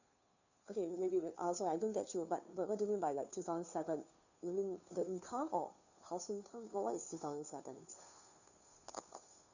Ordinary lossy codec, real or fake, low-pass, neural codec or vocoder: none; fake; 7.2 kHz; codec, 16 kHz, 2 kbps, FunCodec, trained on Chinese and English, 25 frames a second